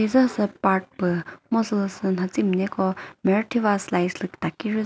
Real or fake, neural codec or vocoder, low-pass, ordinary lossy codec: real; none; none; none